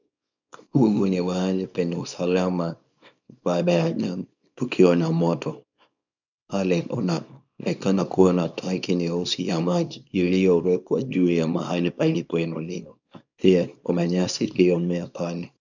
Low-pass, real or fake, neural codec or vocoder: 7.2 kHz; fake; codec, 24 kHz, 0.9 kbps, WavTokenizer, small release